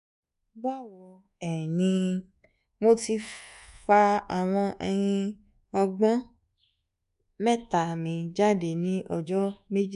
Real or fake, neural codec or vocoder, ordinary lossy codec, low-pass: fake; codec, 24 kHz, 1.2 kbps, DualCodec; none; 10.8 kHz